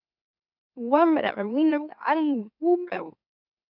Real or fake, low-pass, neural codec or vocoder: fake; 5.4 kHz; autoencoder, 44.1 kHz, a latent of 192 numbers a frame, MeloTTS